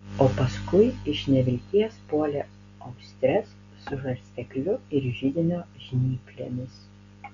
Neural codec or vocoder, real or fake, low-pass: none; real; 7.2 kHz